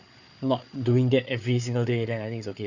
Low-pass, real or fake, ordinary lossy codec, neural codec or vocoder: 7.2 kHz; fake; none; codec, 16 kHz, 8 kbps, FreqCodec, larger model